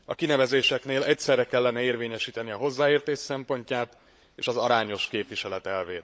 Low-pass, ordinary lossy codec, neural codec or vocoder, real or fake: none; none; codec, 16 kHz, 16 kbps, FunCodec, trained on LibriTTS, 50 frames a second; fake